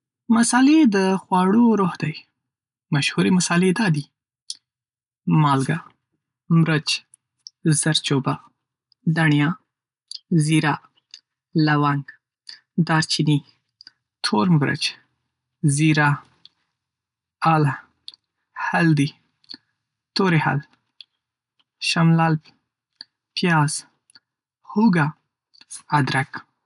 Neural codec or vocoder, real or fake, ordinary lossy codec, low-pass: none; real; none; 10.8 kHz